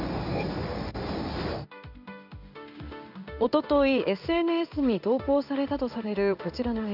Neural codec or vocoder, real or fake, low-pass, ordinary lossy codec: codec, 16 kHz in and 24 kHz out, 1 kbps, XY-Tokenizer; fake; 5.4 kHz; none